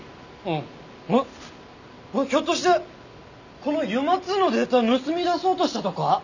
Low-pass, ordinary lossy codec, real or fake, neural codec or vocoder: 7.2 kHz; none; real; none